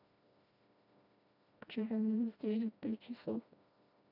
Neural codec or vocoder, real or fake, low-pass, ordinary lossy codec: codec, 16 kHz, 1 kbps, FreqCodec, smaller model; fake; 5.4 kHz; none